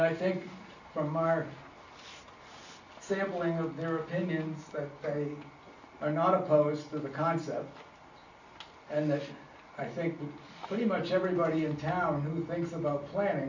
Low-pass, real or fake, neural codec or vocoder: 7.2 kHz; real; none